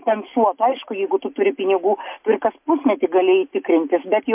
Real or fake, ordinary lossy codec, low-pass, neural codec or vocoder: real; MP3, 32 kbps; 3.6 kHz; none